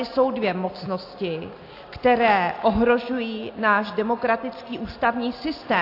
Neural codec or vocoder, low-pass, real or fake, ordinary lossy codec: none; 5.4 kHz; real; AAC, 32 kbps